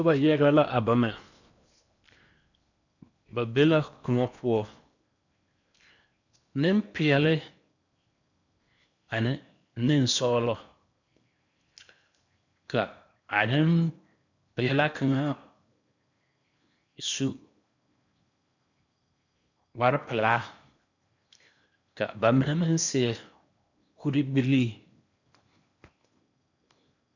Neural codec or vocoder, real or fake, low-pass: codec, 16 kHz in and 24 kHz out, 0.8 kbps, FocalCodec, streaming, 65536 codes; fake; 7.2 kHz